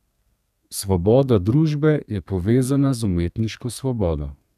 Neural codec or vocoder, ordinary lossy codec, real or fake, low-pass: codec, 32 kHz, 1.9 kbps, SNAC; none; fake; 14.4 kHz